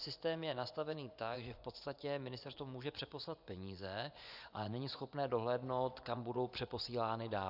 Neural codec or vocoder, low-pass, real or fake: vocoder, 24 kHz, 100 mel bands, Vocos; 5.4 kHz; fake